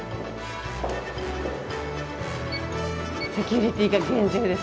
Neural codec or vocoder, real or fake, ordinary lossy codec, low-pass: none; real; none; none